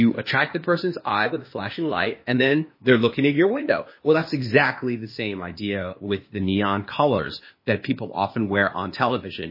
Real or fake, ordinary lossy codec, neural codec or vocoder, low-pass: fake; MP3, 24 kbps; codec, 16 kHz, 0.8 kbps, ZipCodec; 5.4 kHz